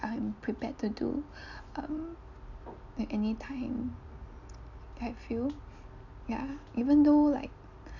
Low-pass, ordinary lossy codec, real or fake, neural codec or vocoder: 7.2 kHz; none; real; none